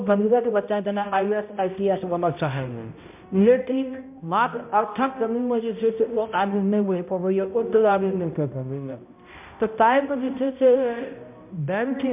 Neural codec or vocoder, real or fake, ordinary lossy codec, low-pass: codec, 16 kHz, 0.5 kbps, X-Codec, HuBERT features, trained on balanced general audio; fake; MP3, 32 kbps; 3.6 kHz